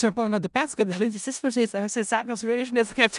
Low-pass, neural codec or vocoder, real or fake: 10.8 kHz; codec, 16 kHz in and 24 kHz out, 0.4 kbps, LongCat-Audio-Codec, four codebook decoder; fake